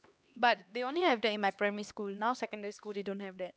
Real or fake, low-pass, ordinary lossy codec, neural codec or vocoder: fake; none; none; codec, 16 kHz, 2 kbps, X-Codec, HuBERT features, trained on LibriSpeech